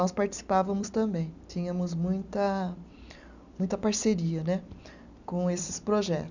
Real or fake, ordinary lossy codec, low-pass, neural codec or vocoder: fake; none; 7.2 kHz; vocoder, 44.1 kHz, 128 mel bands every 256 samples, BigVGAN v2